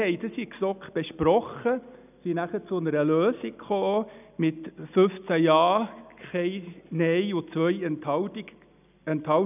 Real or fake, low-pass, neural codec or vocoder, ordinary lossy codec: real; 3.6 kHz; none; none